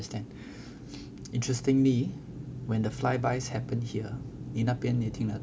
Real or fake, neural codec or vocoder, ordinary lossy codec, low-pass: real; none; none; none